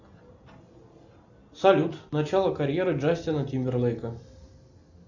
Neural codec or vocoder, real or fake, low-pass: none; real; 7.2 kHz